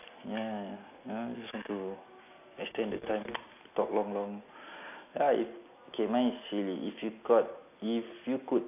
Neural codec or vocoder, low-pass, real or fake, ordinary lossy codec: none; 3.6 kHz; real; AAC, 32 kbps